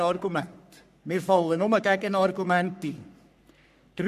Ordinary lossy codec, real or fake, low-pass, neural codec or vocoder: none; fake; 14.4 kHz; codec, 44.1 kHz, 3.4 kbps, Pupu-Codec